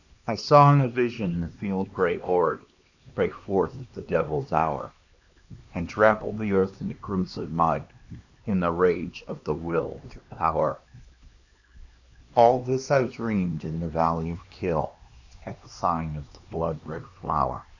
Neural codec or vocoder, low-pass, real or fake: codec, 16 kHz, 2 kbps, X-Codec, HuBERT features, trained on LibriSpeech; 7.2 kHz; fake